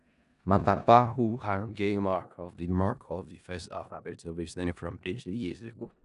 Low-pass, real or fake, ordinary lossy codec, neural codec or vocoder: 10.8 kHz; fake; none; codec, 16 kHz in and 24 kHz out, 0.4 kbps, LongCat-Audio-Codec, four codebook decoder